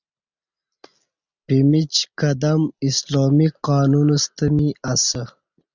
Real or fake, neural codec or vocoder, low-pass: real; none; 7.2 kHz